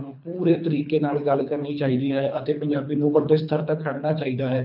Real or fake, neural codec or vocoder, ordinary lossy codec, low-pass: fake; codec, 24 kHz, 3 kbps, HILCodec; none; 5.4 kHz